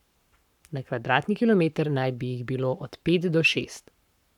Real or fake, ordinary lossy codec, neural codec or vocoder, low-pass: fake; none; codec, 44.1 kHz, 7.8 kbps, Pupu-Codec; 19.8 kHz